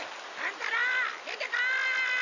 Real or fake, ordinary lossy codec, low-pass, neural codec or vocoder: real; none; 7.2 kHz; none